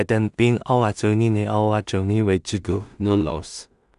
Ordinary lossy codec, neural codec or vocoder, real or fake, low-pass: none; codec, 16 kHz in and 24 kHz out, 0.4 kbps, LongCat-Audio-Codec, two codebook decoder; fake; 10.8 kHz